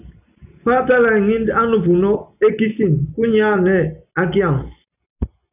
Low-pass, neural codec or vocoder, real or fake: 3.6 kHz; none; real